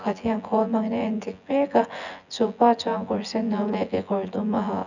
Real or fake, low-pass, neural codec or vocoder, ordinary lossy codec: fake; 7.2 kHz; vocoder, 24 kHz, 100 mel bands, Vocos; none